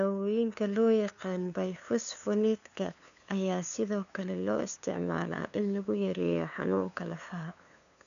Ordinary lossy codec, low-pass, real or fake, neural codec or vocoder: none; 7.2 kHz; fake; codec, 16 kHz, 2 kbps, FunCodec, trained on LibriTTS, 25 frames a second